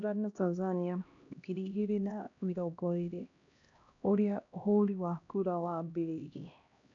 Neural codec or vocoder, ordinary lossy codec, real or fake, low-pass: codec, 16 kHz, 1 kbps, X-Codec, HuBERT features, trained on LibriSpeech; none; fake; 7.2 kHz